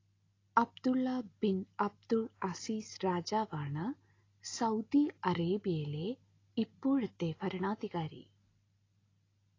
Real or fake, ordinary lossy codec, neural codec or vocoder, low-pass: real; AAC, 32 kbps; none; 7.2 kHz